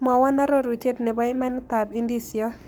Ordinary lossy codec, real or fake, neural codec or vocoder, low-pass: none; fake; codec, 44.1 kHz, 7.8 kbps, Pupu-Codec; none